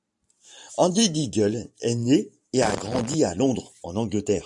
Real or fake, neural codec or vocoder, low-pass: fake; vocoder, 44.1 kHz, 128 mel bands every 512 samples, BigVGAN v2; 10.8 kHz